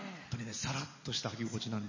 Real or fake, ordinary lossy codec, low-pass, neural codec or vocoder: real; MP3, 32 kbps; 7.2 kHz; none